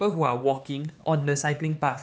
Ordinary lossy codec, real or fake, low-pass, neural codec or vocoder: none; fake; none; codec, 16 kHz, 4 kbps, X-Codec, HuBERT features, trained on LibriSpeech